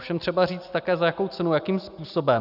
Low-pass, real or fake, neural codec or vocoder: 5.4 kHz; real; none